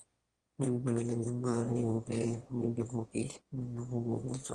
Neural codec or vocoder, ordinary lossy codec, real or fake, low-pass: autoencoder, 22.05 kHz, a latent of 192 numbers a frame, VITS, trained on one speaker; Opus, 24 kbps; fake; 9.9 kHz